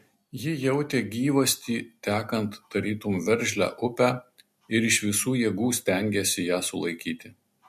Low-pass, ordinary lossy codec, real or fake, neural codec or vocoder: 14.4 kHz; MP3, 64 kbps; real; none